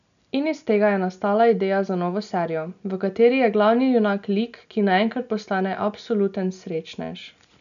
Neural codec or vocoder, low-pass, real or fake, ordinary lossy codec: none; 7.2 kHz; real; none